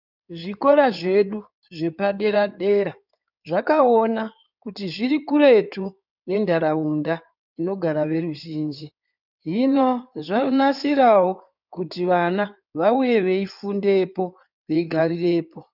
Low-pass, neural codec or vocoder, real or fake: 5.4 kHz; codec, 16 kHz in and 24 kHz out, 2.2 kbps, FireRedTTS-2 codec; fake